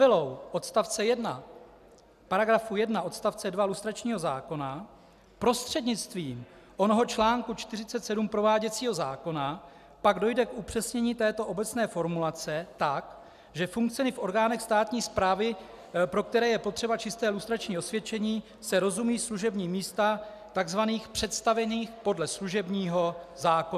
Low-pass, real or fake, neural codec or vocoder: 14.4 kHz; real; none